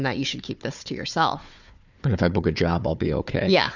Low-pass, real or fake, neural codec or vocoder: 7.2 kHz; fake; codec, 16 kHz, 4 kbps, FunCodec, trained on Chinese and English, 50 frames a second